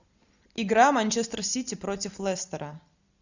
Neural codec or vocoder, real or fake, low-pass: none; real; 7.2 kHz